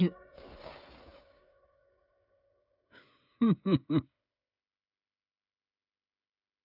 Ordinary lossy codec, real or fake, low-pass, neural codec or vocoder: none; fake; 5.4 kHz; codec, 16 kHz, 8 kbps, FreqCodec, smaller model